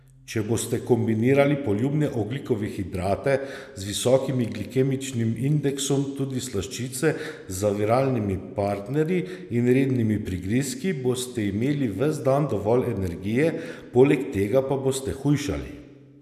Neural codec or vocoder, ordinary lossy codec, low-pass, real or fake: none; none; 14.4 kHz; real